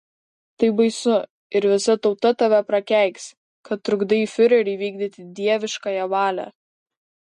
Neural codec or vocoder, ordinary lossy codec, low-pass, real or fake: none; MP3, 48 kbps; 14.4 kHz; real